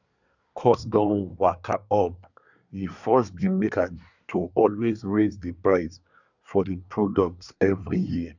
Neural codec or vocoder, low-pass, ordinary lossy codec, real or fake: codec, 24 kHz, 1 kbps, SNAC; 7.2 kHz; none; fake